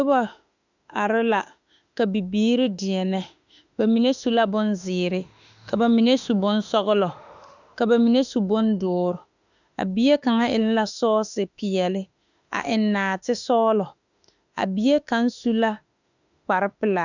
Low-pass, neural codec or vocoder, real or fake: 7.2 kHz; autoencoder, 48 kHz, 32 numbers a frame, DAC-VAE, trained on Japanese speech; fake